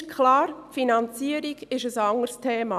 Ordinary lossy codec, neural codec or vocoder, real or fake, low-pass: none; none; real; 14.4 kHz